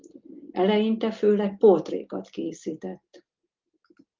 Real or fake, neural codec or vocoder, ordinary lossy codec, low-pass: real; none; Opus, 32 kbps; 7.2 kHz